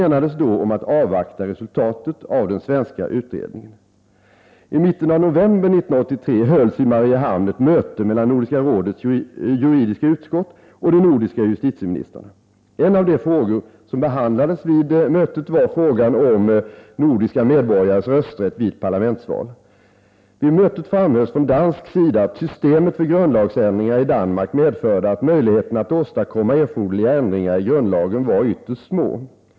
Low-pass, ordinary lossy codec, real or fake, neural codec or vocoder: none; none; real; none